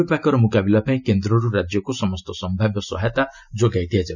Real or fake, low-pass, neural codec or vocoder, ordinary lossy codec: real; 7.2 kHz; none; none